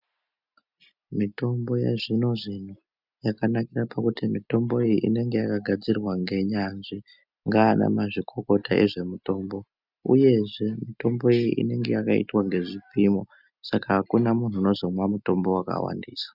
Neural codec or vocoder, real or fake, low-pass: none; real; 5.4 kHz